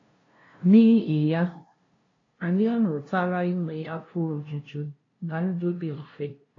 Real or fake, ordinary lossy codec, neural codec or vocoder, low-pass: fake; AAC, 32 kbps; codec, 16 kHz, 0.5 kbps, FunCodec, trained on LibriTTS, 25 frames a second; 7.2 kHz